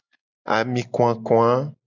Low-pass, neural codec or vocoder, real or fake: 7.2 kHz; none; real